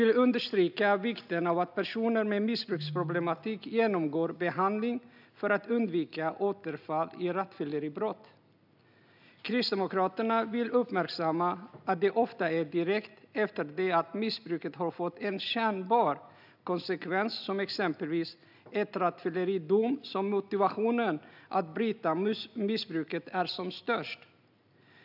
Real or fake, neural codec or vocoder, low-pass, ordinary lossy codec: real; none; 5.4 kHz; none